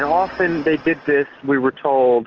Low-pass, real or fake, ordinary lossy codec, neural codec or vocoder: 7.2 kHz; real; Opus, 16 kbps; none